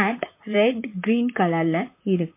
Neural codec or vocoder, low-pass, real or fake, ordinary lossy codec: vocoder, 44.1 kHz, 128 mel bands, Pupu-Vocoder; 3.6 kHz; fake; MP3, 24 kbps